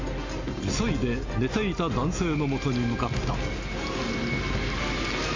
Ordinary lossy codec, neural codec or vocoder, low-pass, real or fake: MP3, 48 kbps; none; 7.2 kHz; real